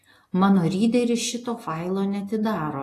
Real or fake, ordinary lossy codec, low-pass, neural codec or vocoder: real; AAC, 48 kbps; 14.4 kHz; none